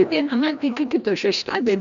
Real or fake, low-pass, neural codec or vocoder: fake; 7.2 kHz; codec, 16 kHz, 1 kbps, FreqCodec, larger model